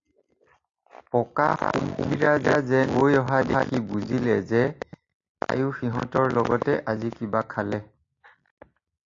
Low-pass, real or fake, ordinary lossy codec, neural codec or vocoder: 7.2 kHz; real; AAC, 48 kbps; none